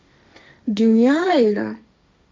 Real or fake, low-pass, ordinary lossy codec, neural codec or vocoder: fake; none; none; codec, 16 kHz, 1.1 kbps, Voila-Tokenizer